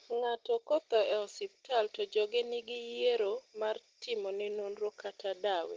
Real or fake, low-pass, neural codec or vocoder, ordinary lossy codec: real; 7.2 kHz; none; Opus, 16 kbps